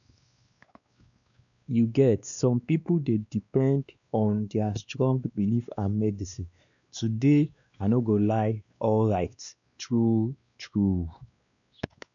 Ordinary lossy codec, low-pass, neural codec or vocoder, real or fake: none; 7.2 kHz; codec, 16 kHz, 2 kbps, X-Codec, WavLM features, trained on Multilingual LibriSpeech; fake